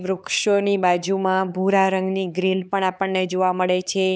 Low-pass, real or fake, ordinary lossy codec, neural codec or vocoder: none; fake; none; codec, 16 kHz, 4 kbps, X-Codec, HuBERT features, trained on LibriSpeech